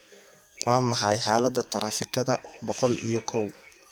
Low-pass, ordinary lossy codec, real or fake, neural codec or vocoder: none; none; fake; codec, 44.1 kHz, 2.6 kbps, SNAC